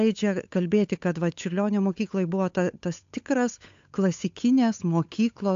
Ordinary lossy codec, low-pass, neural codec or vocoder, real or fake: AAC, 64 kbps; 7.2 kHz; codec, 16 kHz, 8 kbps, FunCodec, trained on Chinese and English, 25 frames a second; fake